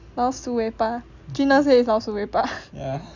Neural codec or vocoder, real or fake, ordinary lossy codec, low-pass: none; real; none; 7.2 kHz